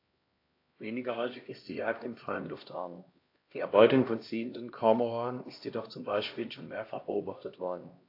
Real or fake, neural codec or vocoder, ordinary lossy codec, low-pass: fake; codec, 16 kHz, 1 kbps, X-Codec, HuBERT features, trained on LibriSpeech; AAC, 32 kbps; 5.4 kHz